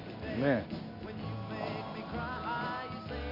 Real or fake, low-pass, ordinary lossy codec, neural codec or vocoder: real; 5.4 kHz; none; none